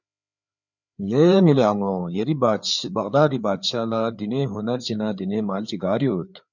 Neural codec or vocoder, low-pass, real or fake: codec, 16 kHz, 4 kbps, FreqCodec, larger model; 7.2 kHz; fake